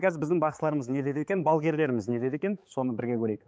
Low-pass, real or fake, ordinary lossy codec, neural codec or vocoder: none; fake; none; codec, 16 kHz, 4 kbps, X-Codec, HuBERT features, trained on balanced general audio